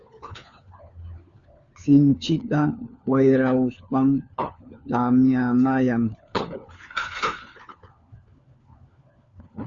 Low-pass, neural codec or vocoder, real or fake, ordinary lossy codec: 7.2 kHz; codec, 16 kHz, 4 kbps, FunCodec, trained on LibriTTS, 50 frames a second; fake; Opus, 64 kbps